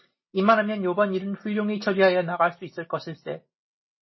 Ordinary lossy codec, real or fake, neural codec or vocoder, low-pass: MP3, 24 kbps; real; none; 7.2 kHz